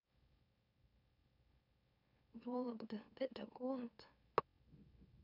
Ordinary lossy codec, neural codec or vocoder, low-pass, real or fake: none; autoencoder, 44.1 kHz, a latent of 192 numbers a frame, MeloTTS; 5.4 kHz; fake